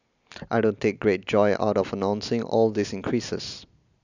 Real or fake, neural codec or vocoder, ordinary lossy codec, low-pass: real; none; none; 7.2 kHz